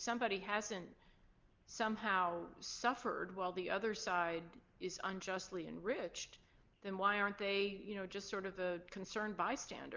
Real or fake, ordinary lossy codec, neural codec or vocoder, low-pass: real; Opus, 24 kbps; none; 7.2 kHz